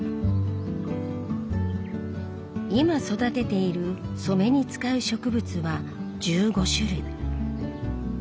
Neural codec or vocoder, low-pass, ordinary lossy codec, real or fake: none; none; none; real